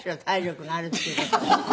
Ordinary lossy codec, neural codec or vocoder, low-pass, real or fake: none; none; none; real